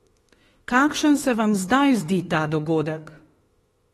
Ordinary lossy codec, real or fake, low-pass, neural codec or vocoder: AAC, 32 kbps; fake; 19.8 kHz; autoencoder, 48 kHz, 32 numbers a frame, DAC-VAE, trained on Japanese speech